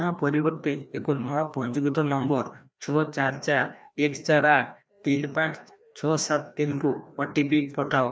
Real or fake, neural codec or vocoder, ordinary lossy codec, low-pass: fake; codec, 16 kHz, 1 kbps, FreqCodec, larger model; none; none